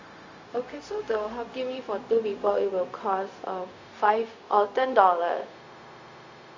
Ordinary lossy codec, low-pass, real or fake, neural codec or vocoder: AAC, 48 kbps; 7.2 kHz; fake; codec, 16 kHz, 0.4 kbps, LongCat-Audio-Codec